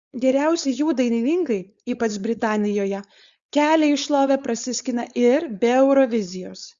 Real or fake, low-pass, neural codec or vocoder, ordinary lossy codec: fake; 7.2 kHz; codec, 16 kHz, 4.8 kbps, FACodec; Opus, 64 kbps